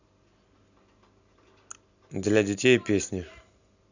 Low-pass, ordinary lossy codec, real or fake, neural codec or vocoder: 7.2 kHz; none; real; none